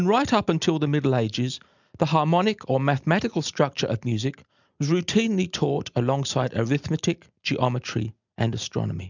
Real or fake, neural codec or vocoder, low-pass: real; none; 7.2 kHz